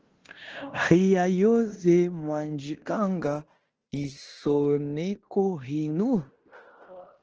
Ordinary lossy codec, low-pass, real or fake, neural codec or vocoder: Opus, 16 kbps; 7.2 kHz; fake; codec, 16 kHz in and 24 kHz out, 0.9 kbps, LongCat-Audio-Codec, fine tuned four codebook decoder